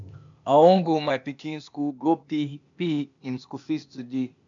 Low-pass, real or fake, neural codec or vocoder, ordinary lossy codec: 7.2 kHz; fake; codec, 16 kHz, 0.8 kbps, ZipCodec; MP3, 64 kbps